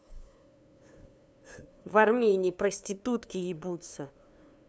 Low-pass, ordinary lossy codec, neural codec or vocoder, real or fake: none; none; codec, 16 kHz, 2 kbps, FunCodec, trained on LibriTTS, 25 frames a second; fake